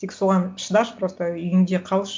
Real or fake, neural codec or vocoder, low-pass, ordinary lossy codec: real; none; none; none